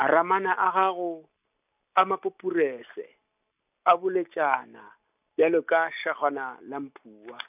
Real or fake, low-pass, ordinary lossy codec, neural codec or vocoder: real; 3.6 kHz; none; none